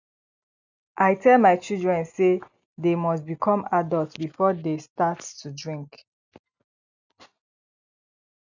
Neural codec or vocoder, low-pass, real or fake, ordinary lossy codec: none; 7.2 kHz; real; none